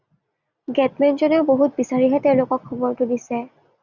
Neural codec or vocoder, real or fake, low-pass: none; real; 7.2 kHz